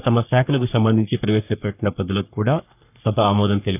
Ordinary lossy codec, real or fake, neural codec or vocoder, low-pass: none; fake; codec, 44.1 kHz, 3.4 kbps, Pupu-Codec; 3.6 kHz